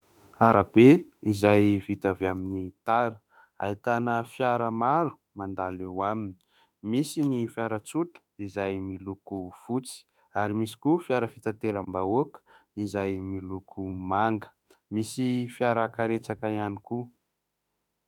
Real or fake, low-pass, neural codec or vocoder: fake; 19.8 kHz; autoencoder, 48 kHz, 32 numbers a frame, DAC-VAE, trained on Japanese speech